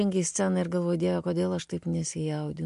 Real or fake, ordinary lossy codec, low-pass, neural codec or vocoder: real; MP3, 64 kbps; 10.8 kHz; none